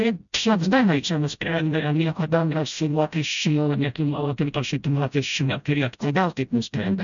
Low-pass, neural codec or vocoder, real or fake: 7.2 kHz; codec, 16 kHz, 0.5 kbps, FreqCodec, smaller model; fake